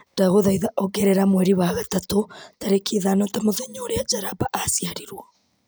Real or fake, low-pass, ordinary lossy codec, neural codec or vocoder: real; none; none; none